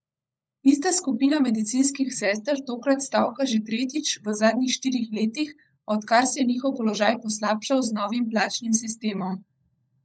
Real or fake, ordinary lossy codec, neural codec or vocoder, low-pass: fake; none; codec, 16 kHz, 16 kbps, FunCodec, trained on LibriTTS, 50 frames a second; none